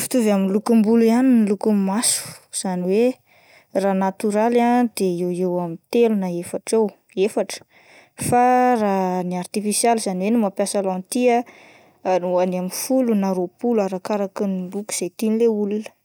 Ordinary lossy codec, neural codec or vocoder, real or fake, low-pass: none; none; real; none